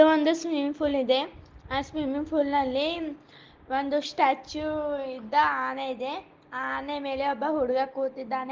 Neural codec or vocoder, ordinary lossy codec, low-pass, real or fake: none; Opus, 16 kbps; 7.2 kHz; real